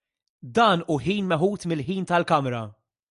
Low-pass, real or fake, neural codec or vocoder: 10.8 kHz; real; none